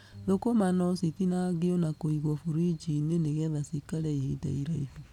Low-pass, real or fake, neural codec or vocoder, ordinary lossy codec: 19.8 kHz; real; none; none